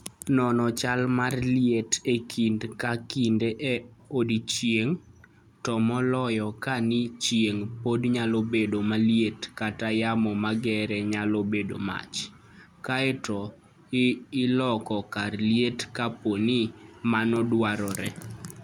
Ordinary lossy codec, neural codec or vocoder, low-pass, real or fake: none; none; 19.8 kHz; real